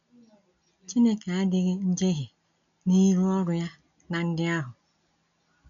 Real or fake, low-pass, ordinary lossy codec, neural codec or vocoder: real; 7.2 kHz; none; none